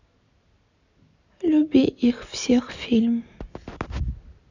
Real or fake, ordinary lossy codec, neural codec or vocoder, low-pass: real; none; none; 7.2 kHz